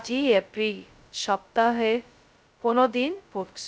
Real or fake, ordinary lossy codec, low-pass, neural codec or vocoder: fake; none; none; codec, 16 kHz, 0.2 kbps, FocalCodec